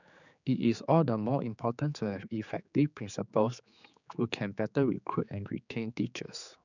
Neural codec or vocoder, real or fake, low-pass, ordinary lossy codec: codec, 16 kHz, 4 kbps, X-Codec, HuBERT features, trained on general audio; fake; 7.2 kHz; none